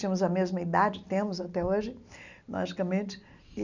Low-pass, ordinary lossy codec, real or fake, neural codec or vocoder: 7.2 kHz; none; real; none